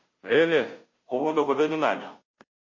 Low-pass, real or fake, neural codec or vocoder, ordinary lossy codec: 7.2 kHz; fake; codec, 16 kHz, 0.5 kbps, FunCodec, trained on Chinese and English, 25 frames a second; MP3, 32 kbps